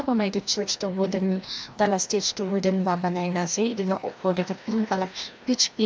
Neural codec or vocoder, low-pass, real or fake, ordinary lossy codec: codec, 16 kHz, 1 kbps, FreqCodec, larger model; none; fake; none